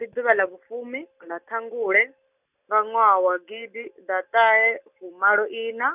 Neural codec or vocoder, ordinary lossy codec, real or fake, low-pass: none; none; real; 3.6 kHz